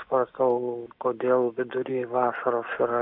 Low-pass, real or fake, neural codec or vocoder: 5.4 kHz; real; none